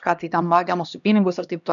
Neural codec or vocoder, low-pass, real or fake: codec, 16 kHz, 1 kbps, X-Codec, HuBERT features, trained on LibriSpeech; 7.2 kHz; fake